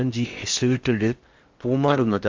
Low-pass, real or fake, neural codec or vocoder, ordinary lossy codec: 7.2 kHz; fake; codec, 16 kHz in and 24 kHz out, 0.6 kbps, FocalCodec, streaming, 4096 codes; Opus, 32 kbps